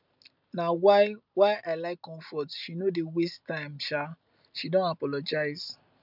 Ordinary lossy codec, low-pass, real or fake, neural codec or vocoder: none; 5.4 kHz; real; none